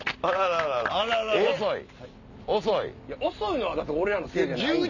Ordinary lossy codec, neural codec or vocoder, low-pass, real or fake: none; none; 7.2 kHz; real